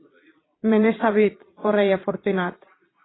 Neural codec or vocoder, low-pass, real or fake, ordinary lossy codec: vocoder, 22.05 kHz, 80 mel bands, Vocos; 7.2 kHz; fake; AAC, 16 kbps